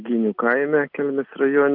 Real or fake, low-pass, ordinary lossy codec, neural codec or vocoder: real; 5.4 kHz; Opus, 32 kbps; none